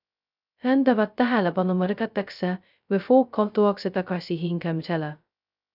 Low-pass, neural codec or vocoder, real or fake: 5.4 kHz; codec, 16 kHz, 0.2 kbps, FocalCodec; fake